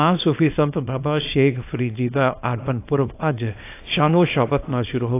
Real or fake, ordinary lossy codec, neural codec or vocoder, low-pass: fake; AAC, 24 kbps; codec, 24 kHz, 0.9 kbps, WavTokenizer, small release; 3.6 kHz